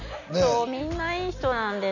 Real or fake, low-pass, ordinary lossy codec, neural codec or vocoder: real; 7.2 kHz; none; none